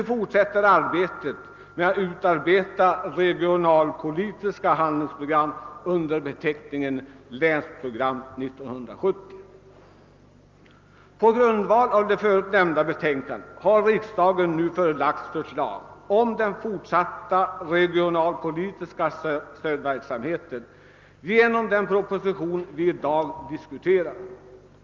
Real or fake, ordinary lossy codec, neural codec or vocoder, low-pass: real; Opus, 24 kbps; none; 7.2 kHz